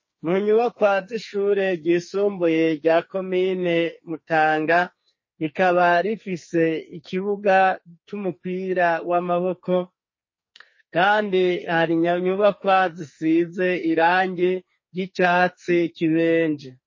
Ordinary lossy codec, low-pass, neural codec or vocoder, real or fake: MP3, 32 kbps; 7.2 kHz; codec, 44.1 kHz, 2.6 kbps, SNAC; fake